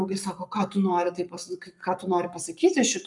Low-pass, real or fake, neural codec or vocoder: 10.8 kHz; fake; codec, 44.1 kHz, 7.8 kbps, Pupu-Codec